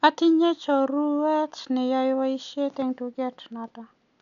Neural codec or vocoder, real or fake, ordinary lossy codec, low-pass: none; real; none; 7.2 kHz